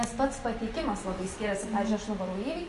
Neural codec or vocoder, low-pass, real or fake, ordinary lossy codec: none; 14.4 kHz; real; MP3, 48 kbps